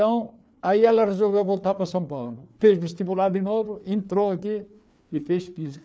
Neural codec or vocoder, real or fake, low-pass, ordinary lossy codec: codec, 16 kHz, 4 kbps, FreqCodec, larger model; fake; none; none